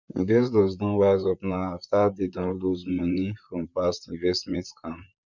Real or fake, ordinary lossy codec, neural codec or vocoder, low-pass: fake; none; vocoder, 44.1 kHz, 128 mel bands, Pupu-Vocoder; 7.2 kHz